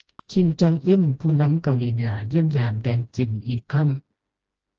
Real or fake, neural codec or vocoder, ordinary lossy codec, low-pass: fake; codec, 16 kHz, 1 kbps, FreqCodec, smaller model; Opus, 24 kbps; 7.2 kHz